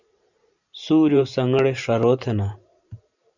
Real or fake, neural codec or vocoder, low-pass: fake; vocoder, 44.1 kHz, 128 mel bands every 512 samples, BigVGAN v2; 7.2 kHz